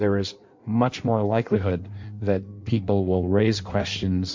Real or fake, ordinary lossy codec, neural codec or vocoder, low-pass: fake; MP3, 48 kbps; codec, 16 kHz in and 24 kHz out, 1.1 kbps, FireRedTTS-2 codec; 7.2 kHz